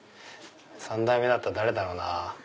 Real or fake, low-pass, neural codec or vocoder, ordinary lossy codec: real; none; none; none